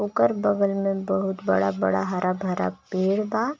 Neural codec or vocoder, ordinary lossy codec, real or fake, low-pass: none; none; real; none